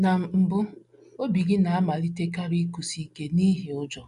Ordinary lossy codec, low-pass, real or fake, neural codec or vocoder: none; 10.8 kHz; real; none